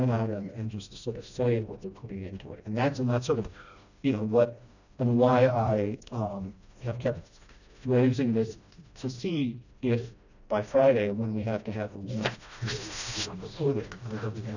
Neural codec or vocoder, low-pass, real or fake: codec, 16 kHz, 1 kbps, FreqCodec, smaller model; 7.2 kHz; fake